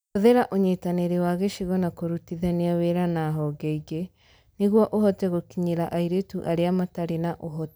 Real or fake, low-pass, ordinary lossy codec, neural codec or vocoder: real; none; none; none